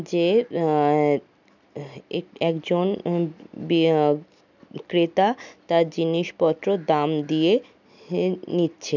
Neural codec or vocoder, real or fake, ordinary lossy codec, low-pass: none; real; none; 7.2 kHz